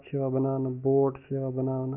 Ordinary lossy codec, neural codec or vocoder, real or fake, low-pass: none; autoencoder, 48 kHz, 128 numbers a frame, DAC-VAE, trained on Japanese speech; fake; 3.6 kHz